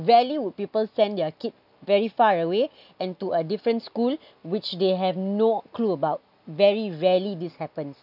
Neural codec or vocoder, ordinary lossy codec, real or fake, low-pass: none; none; real; 5.4 kHz